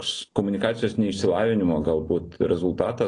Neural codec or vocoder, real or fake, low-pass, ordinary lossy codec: none; real; 9.9 kHz; AAC, 32 kbps